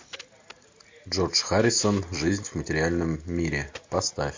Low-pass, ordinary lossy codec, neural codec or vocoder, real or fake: 7.2 kHz; MP3, 48 kbps; none; real